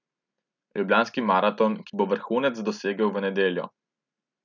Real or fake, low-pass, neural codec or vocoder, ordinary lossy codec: real; 7.2 kHz; none; none